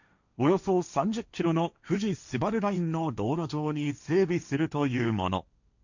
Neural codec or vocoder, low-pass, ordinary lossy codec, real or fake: codec, 16 kHz, 1.1 kbps, Voila-Tokenizer; 7.2 kHz; none; fake